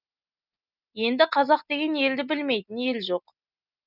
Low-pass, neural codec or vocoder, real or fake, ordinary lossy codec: 5.4 kHz; none; real; none